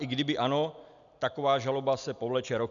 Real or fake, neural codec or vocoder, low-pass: real; none; 7.2 kHz